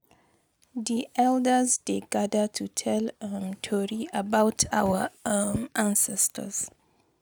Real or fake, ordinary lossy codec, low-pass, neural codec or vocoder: real; none; none; none